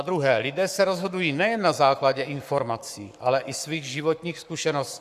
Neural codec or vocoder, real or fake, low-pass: codec, 44.1 kHz, 7.8 kbps, Pupu-Codec; fake; 14.4 kHz